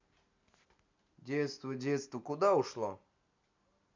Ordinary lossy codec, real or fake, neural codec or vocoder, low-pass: MP3, 64 kbps; real; none; 7.2 kHz